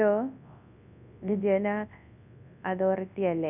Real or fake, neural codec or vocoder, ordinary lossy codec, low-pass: fake; codec, 24 kHz, 0.9 kbps, WavTokenizer, large speech release; none; 3.6 kHz